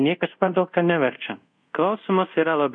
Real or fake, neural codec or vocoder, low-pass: fake; codec, 24 kHz, 0.5 kbps, DualCodec; 9.9 kHz